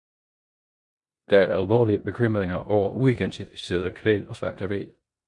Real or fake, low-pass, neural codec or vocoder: fake; 10.8 kHz; codec, 16 kHz in and 24 kHz out, 0.9 kbps, LongCat-Audio-Codec, four codebook decoder